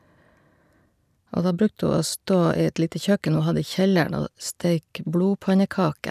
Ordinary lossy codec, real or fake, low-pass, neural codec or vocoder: none; real; 14.4 kHz; none